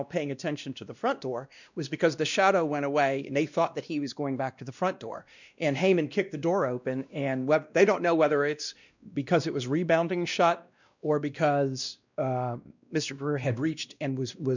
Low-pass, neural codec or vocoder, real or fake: 7.2 kHz; codec, 16 kHz, 1 kbps, X-Codec, WavLM features, trained on Multilingual LibriSpeech; fake